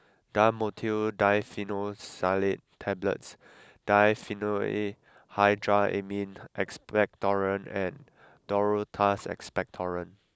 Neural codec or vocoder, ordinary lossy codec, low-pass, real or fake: none; none; none; real